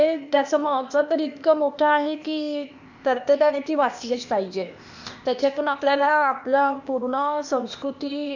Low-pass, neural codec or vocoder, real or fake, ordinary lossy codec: 7.2 kHz; codec, 16 kHz, 0.8 kbps, ZipCodec; fake; none